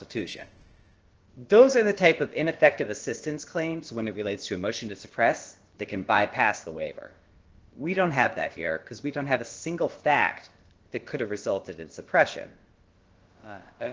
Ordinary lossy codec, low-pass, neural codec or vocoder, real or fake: Opus, 16 kbps; 7.2 kHz; codec, 16 kHz, about 1 kbps, DyCAST, with the encoder's durations; fake